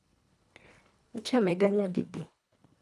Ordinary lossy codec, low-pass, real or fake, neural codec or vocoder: none; none; fake; codec, 24 kHz, 1.5 kbps, HILCodec